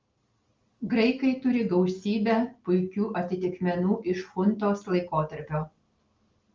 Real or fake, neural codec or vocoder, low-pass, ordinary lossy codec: real; none; 7.2 kHz; Opus, 32 kbps